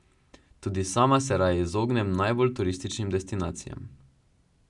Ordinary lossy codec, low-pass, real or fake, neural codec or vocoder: none; 10.8 kHz; real; none